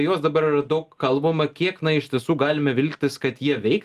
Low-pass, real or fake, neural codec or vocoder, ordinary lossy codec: 14.4 kHz; real; none; Opus, 32 kbps